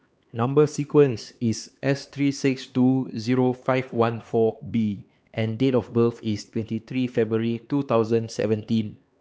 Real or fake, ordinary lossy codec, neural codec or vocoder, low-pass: fake; none; codec, 16 kHz, 2 kbps, X-Codec, HuBERT features, trained on LibriSpeech; none